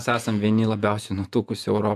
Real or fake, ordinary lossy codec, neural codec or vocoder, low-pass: fake; AAC, 96 kbps; vocoder, 48 kHz, 128 mel bands, Vocos; 14.4 kHz